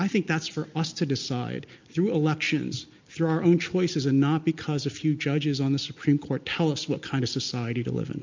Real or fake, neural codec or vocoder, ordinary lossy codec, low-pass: real; none; MP3, 64 kbps; 7.2 kHz